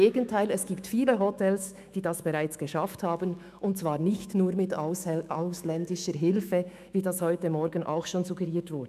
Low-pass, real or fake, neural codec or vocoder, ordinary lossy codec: 14.4 kHz; fake; autoencoder, 48 kHz, 128 numbers a frame, DAC-VAE, trained on Japanese speech; none